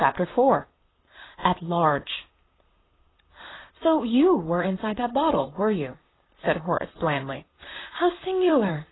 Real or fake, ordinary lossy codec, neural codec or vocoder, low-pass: fake; AAC, 16 kbps; vocoder, 44.1 kHz, 128 mel bands, Pupu-Vocoder; 7.2 kHz